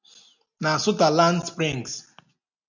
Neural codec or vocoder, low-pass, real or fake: none; 7.2 kHz; real